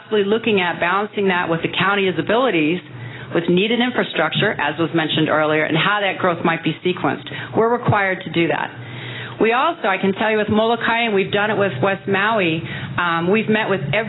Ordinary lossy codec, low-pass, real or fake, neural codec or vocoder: AAC, 16 kbps; 7.2 kHz; real; none